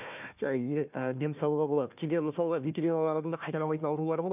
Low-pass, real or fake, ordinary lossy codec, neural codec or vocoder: 3.6 kHz; fake; none; codec, 16 kHz, 1 kbps, FunCodec, trained on Chinese and English, 50 frames a second